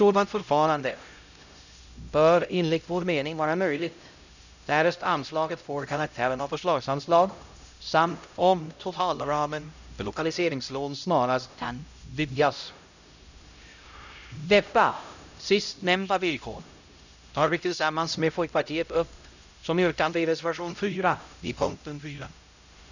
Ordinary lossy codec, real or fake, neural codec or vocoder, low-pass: none; fake; codec, 16 kHz, 0.5 kbps, X-Codec, HuBERT features, trained on LibriSpeech; 7.2 kHz